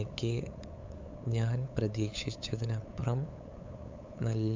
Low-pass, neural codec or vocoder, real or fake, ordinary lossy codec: 7.2 kHz; codec, 16 kHz, 8 kbps, FunCodec, trained on LibriTTS, 25 frames a second; fake; MP3, 64 kbps